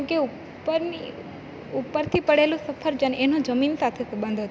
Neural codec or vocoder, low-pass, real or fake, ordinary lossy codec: none; none; real; none